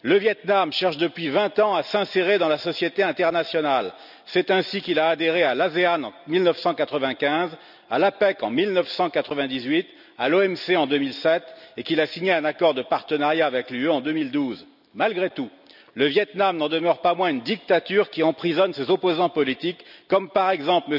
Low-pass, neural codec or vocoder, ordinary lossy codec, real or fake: 5.4 kHz; none; none; real